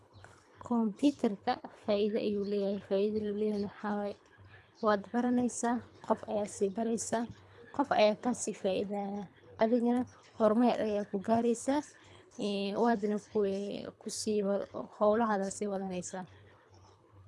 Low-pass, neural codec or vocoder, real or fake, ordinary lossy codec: none; codec, 24 kHz, 3 kbps, HILCodec; fake; none